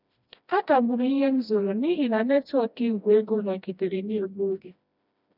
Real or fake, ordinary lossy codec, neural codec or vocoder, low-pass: fake; none; codec, 16 kHz, 1 kbps, FreqCodec, smaller model; 5.4 kHz